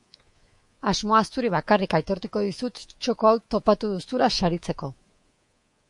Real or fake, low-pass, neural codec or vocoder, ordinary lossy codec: fake; 10.8 kHz; codec, 24 kHz, 3.1 kbps, DualCodec; MP3, 48 kbps